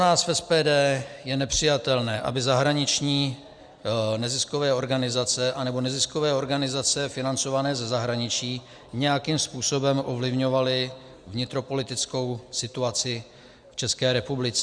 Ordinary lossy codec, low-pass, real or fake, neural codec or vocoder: Opus, 64 kbps; 9.9 kHz; real; none